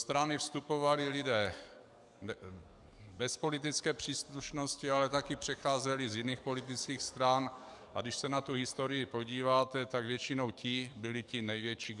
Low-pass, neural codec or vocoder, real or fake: 10.8 kHz; codec, 44.1 kHz, 7.8 kbps, DAC; fake